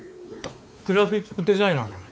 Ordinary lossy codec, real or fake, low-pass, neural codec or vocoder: none; fake; none; codec, 16 kHz, 4 kbps, X-Codec, WavLM features, trained on Multilingual LibriSpeech